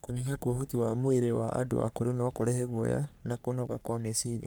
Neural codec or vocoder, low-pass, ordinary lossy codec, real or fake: codec, 44.1 kHz, 3.4 kbps, Pupu-Codec; none; none; fake